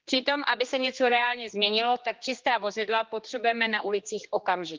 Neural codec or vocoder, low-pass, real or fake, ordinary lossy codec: codec, 16 kHz, 2 kbps, X-Codec, HuBERT features, trained on general audio; 7.2 kHz; fake; Opus, 32 kbps